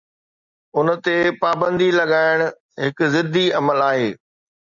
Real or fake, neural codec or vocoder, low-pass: real; none; 7.2 kHz